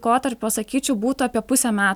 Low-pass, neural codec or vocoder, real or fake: 19.8 kHz; none; real